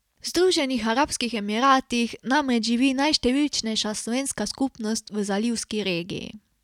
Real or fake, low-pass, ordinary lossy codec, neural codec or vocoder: real; 19.8 kHz; none; none